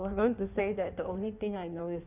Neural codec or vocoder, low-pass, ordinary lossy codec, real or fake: codec, 16 kHz in and 24 kHz out, 1.1 kbps, FireRedTTS-2 codec; 3.6 kHz; none; fake